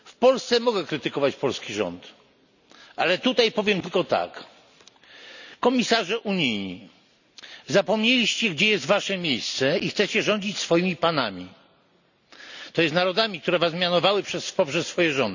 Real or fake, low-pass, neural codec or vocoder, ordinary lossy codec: real; 7.2 kHz; none; none